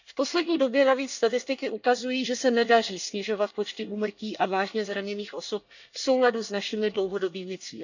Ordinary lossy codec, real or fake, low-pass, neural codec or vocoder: none; fake; 7.2 kHz; codec, 24 kHz, 1 kbps, SNAC